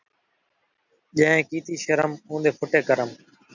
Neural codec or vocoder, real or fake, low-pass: none; real; 7.2 kHz